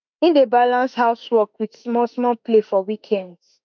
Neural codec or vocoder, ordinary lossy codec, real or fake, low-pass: autoencoder, 48 kHz, 32 numbers a frame, DAC-VAE, trained on Japanese speech; none; fake; 7.2 kHz